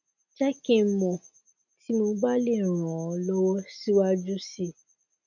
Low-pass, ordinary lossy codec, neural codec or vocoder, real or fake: 7.2 kHz; none; none; real